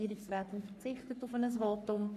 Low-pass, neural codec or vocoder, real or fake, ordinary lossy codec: 14.4 kHz; codec, 44.1 kHz, 3.4 kbps, Pupu-Codec; fake; none